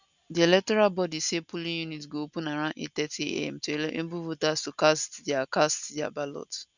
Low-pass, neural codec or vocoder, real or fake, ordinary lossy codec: 7.2 kHz; none; real; none